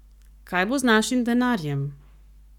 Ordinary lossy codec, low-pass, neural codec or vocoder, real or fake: none; 19.8 kHz; codec, 44.1 kHz, 7.8 kbps, Pupu-Codec; fake